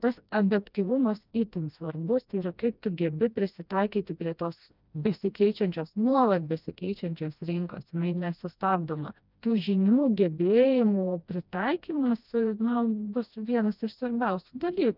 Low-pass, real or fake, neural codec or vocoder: 5.4 kHz; fake; codec, 16 kHz, 1 kbps, FreqCodec, smaller model